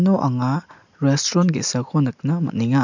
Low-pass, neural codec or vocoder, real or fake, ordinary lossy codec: 7.2 kHz; none; real; none